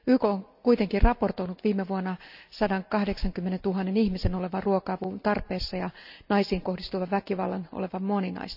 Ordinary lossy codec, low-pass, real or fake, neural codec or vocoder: none; 5.4 kHz; real; none